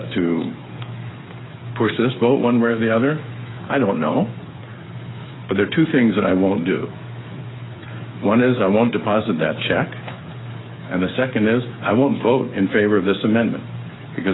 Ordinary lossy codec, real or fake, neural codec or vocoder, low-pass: AAC, 16 kbps; fake; codec, 16 kHz, 8 kbps, FreqCodec, smaller model; 7.2 kHz